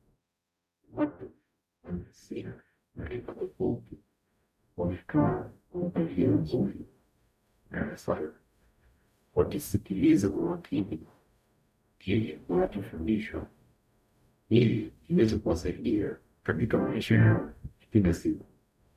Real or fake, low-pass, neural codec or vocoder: fake; 14.4 kHz; codec, 44.1 kHz, 0.9 kbps, DAC